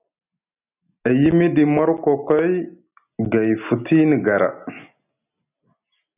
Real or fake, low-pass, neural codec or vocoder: real; 3.6 kHz; none